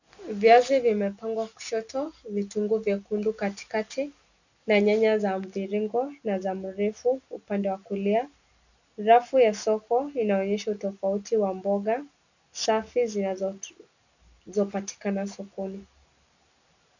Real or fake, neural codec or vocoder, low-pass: real; none; 7.2 kHz